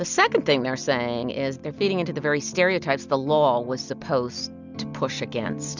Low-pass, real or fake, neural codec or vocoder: 7.2 kHz; real; none